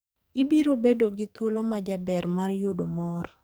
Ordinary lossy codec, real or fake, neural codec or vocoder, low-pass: none; fake; codec, 44.1 kHz, 2.6 kbps, SNAC; none